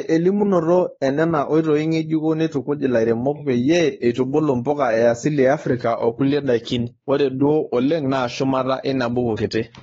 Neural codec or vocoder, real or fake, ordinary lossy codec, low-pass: codec, 16 kHz, 4 kbps, X-Codec, WavLM features, trained on Multilingual LibriSpeech; fake; AAC, 24 kbps; 7.2 kHz